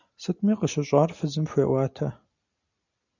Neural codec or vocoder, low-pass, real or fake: none; 7.2 kHz; real